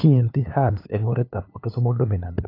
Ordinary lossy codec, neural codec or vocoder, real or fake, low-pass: AAC, 32 kbps; codec, 16 kHz, 2 kbps, FunCodec, trained on LibriTTS, 25 frames a second; fake; 5.4 kHz